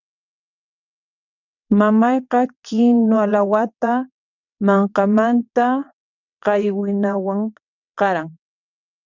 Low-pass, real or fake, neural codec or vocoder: 7.2 kHz; fake; vocoder, 22.05 kHz, 80 mel bands, WaveNeXt